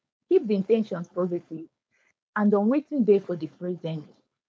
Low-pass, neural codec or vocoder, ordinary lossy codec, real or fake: none; codec, 16 kHz, 4.8 kbps, FACodec; none; fake